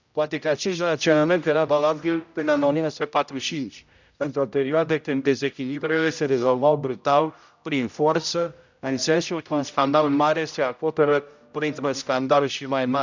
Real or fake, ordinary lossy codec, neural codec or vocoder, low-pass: fake; none; codec, 16 kHz, 0.5 kbps, X-Codec, HuBERT features, trained on general audio; 7.2 kHz